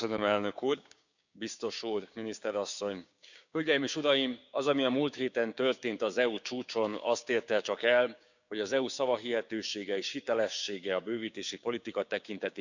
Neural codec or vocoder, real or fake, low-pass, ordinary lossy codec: codec, 16 kHz, 6 kbps, DAC; fake; 7.2 kHz; none